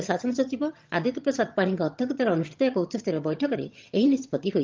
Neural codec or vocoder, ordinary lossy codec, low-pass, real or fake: vocoder, 22.05 kHz, 80 mel bands, HiFi-GAN; Opus, 24 kbps; 7.2 kHz; fake